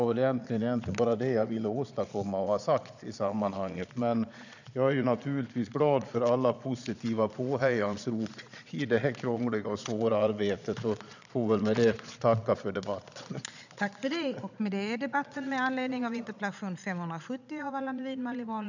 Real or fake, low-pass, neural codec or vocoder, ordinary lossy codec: fake; 7.2 kHz; vocoder, 22.05 kHz, 80 mel bands, Vocos; none